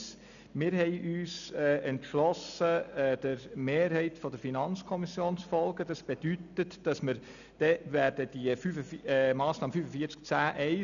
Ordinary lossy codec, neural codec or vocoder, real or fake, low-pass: none; none; real; 7.2 kHz